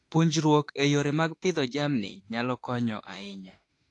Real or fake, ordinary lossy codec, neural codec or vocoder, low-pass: fake; AAC, 48 kbps; autoencoder, 48 kHz, 32 numbers a frame, DAC-VAE, trained on Japanese speech; 10.8 kHz